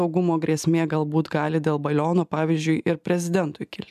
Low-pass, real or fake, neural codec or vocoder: 14.4 kHz; real; none